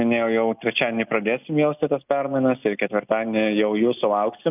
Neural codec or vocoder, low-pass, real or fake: none; 3.6 kHz; real